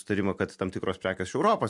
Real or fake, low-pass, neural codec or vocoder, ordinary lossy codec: real; 10.8 kHz; none; MP3, 64 kbps